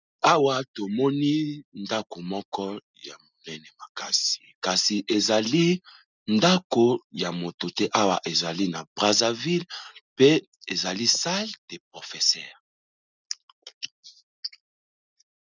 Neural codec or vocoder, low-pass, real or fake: none; 7.2 kHz; real